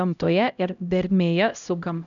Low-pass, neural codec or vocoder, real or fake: 7.2 kHz; codec, 16 kHz, 0.5 kbps, X-Codec, HuBERT features, trained on LibriSpeech; fake